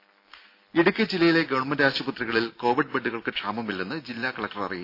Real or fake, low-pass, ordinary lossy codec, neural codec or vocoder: real; 5.4 kHz; AAC, 32 kbps; none